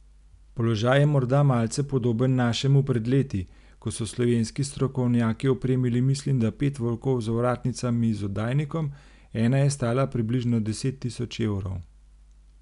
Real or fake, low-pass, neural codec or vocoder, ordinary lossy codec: real; 10.8 kHz; none; none